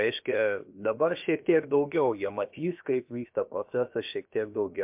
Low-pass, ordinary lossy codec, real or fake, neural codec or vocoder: 3.6 kHz; MP3, 32 kbps; fake; codec, 16 kHz, about 1 kbps, DyCAST, with the encoder's durations